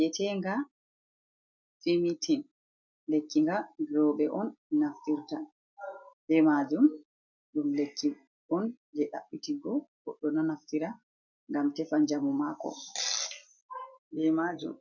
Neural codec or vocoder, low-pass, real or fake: none; 7.2 kHz; real